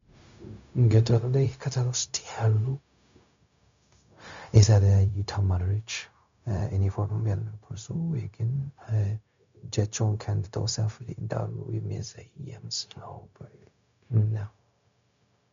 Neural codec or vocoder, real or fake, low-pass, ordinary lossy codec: codec, 16 kHz, 0.4 kbps, LongCat-Audio-Codec; fake; 7.2 kHz; MP3, 64 kbps